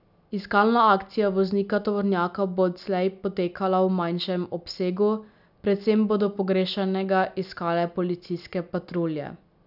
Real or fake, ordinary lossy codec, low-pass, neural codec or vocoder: real; none; 5.4 kHz; none